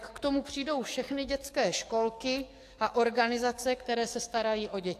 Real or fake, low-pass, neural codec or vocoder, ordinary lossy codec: fake; 14.4 kHz; codec, 44.1 kHz, 7.8 kbps, DAC; AAC, 64 kbps